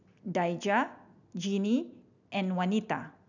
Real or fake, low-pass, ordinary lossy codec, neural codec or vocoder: real; 7.2 kHz; none; none